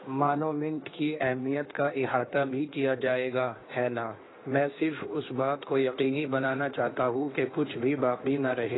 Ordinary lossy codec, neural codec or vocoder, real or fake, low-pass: AAC, 16 kbps; codec, 16 kHz in and 24 kHz out, 1.1 kbps, FireRedTTS-2 codec; fake; 7.2 kHz